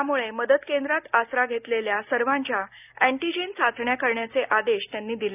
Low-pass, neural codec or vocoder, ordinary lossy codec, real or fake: 3.6 kHz; none; none; real